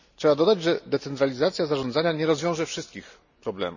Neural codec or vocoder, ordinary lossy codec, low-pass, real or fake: none; none; 7.2 kHz; real